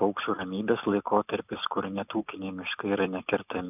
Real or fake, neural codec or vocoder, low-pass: real; none; 3.6 kHz